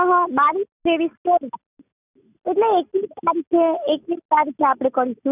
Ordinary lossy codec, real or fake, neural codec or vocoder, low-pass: none; real; none; 3.6 kHz